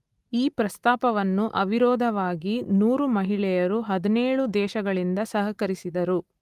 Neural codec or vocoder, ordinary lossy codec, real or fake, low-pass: none; Opus, 32 kbps; real; 14.4 kHz